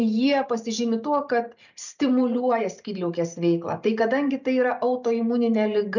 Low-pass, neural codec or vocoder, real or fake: 7.2 kHz; none; real